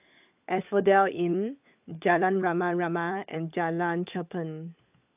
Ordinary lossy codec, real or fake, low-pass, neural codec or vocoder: AAC, 32 kbps; fake; 3.6 kHz; codec, 16 kHz, 16 kbps, FunCodec, trained on LibriTTS, 50 frames a second